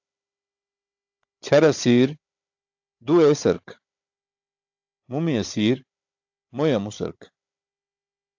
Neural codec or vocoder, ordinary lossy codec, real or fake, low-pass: codec, 16 kHz, 16 kbps, FunCodec, trained on Chinese and English, 50 frames a second; AAC, 48 kbps; fake; 7.2 kHz